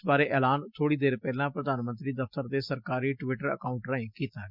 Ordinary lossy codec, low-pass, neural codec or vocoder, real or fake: Opus, 64 kbps; 5.4 kHz; none; real